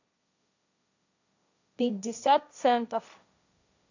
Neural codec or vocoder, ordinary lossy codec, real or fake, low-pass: codec, 16 kHz, 1.1 kbps, Voila-Tokenizer; none; fake; 7.2 kHz